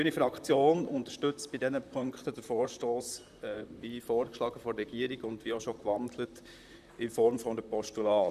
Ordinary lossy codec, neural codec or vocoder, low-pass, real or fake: none; vocoder, 44.1 kHz, 128 mel bands, Pupu-Vocoder; 14.4 kHz; fake